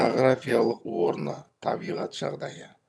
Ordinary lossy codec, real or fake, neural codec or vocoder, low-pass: none; fake; vocoder, 22.05 kHz, 80 mel bands, HiFi-GAN; none